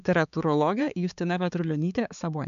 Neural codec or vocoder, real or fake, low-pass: codec, 16 kHz, 4 kbps, X-Codec, HuBERT features, trained on balanced general audio; fake; 7.2 kHz